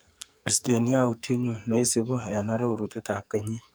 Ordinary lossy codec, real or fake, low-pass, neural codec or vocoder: none; fake; none; codec, 44.1 kHz, 2.6 kbps, SNAC